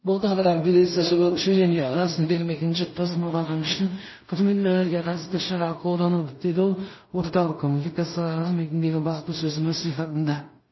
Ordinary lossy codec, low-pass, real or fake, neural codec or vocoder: MP3, 24 kbps; 7.2 kHz; fake; codec, 16 kHz in and 24 kHz out, 0.4 kbps, LongCat-Audio-Codec, two codebook decoder